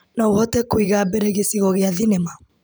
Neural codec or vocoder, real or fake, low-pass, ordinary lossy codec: vocoder, 44.1 kHz, 128 mel bands every 512 samples, BigVGAN v2; fake; none; none